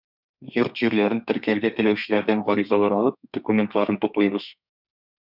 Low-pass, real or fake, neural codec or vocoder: 5.4 kHz; fake; codec, 32 kHz, 1.9 kbps, SNAC